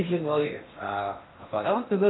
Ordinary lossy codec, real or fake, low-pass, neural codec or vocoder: AAC, 16 kbps; fake; 7.2 kHz; codec, 16 kHz in and 24 kHz out, 0.8 kbps, FocalCodec, streaming, 65536 codes